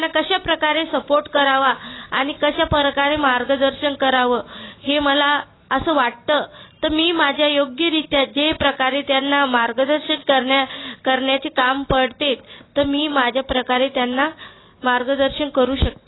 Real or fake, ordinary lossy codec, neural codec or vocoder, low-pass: real; AAC, 16 kbps; none; 7.2 kHz